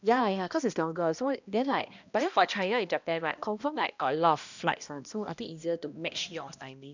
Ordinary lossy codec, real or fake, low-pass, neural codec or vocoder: none; fake; 7.2 kHz; codec, 16 kHz, 1 kbps, X-Codec, HuBERT features, trained on balanced general audio